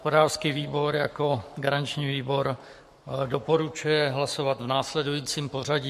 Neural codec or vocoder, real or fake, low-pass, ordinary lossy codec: codec, 44.1 kHz, 7.8 kbps, Pupu-Codec; fake; 14.4 kHz; MP3, 64 kbps